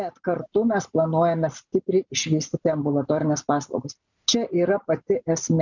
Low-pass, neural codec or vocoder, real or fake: 7.2 kHz; none; real